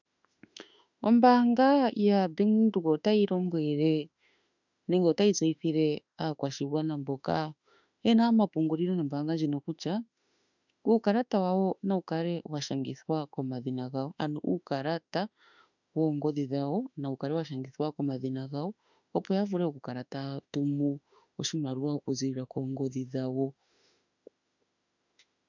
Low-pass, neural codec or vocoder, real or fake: 7.2 kHz; autoencoder, 48 kHz, 32 numbers a frame, DAC-VAE, trained on Japanese speech; fake